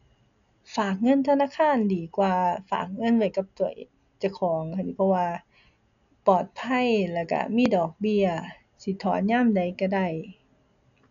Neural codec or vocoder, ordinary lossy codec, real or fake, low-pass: none; none; real; 7.2 kHz